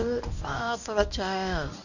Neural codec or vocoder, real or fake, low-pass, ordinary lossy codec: codec, 24 kHz, 0.9 kbps, WavTokenizer, medium speech release version 1; fake; 7.2 kHz; none